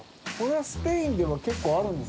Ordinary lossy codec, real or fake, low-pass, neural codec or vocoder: none; real; none; none